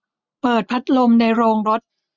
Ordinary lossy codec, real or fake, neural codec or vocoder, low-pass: none; real; none; 7.2 kHz